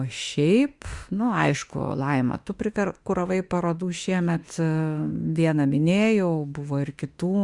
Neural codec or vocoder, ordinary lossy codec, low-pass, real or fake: autoencoder, 48 kHz, 32 numbers a frame, DAC-VAE, trained on Japanese speech; Opus, 64 kbps; 10.8 kHz; fake